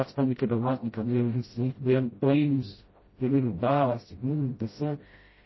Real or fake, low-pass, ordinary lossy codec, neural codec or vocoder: fake; 7.2 kHz; MP3, 24 kbps; codec, 16 kHz, 0.5 kbps, FreqCodec, smaller model